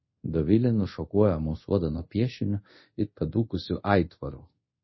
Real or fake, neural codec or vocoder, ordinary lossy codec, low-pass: fake; codec, 24 kHz, 0.5 kbps, DualCodec; MP3, 24 kbps; 7.2 kHz